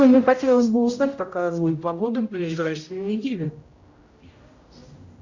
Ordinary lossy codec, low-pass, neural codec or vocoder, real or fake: Opus, 64 kbps; 7.2 kHz; codec, 16 kHz, 0.5 kbps, X-Codec, HuBERT features, trained on general audio; fake